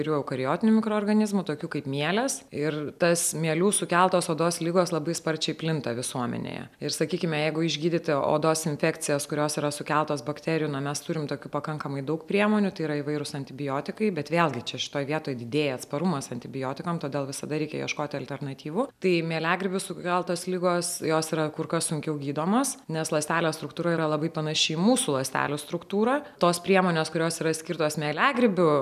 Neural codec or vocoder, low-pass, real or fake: none; 14.4 kHz; real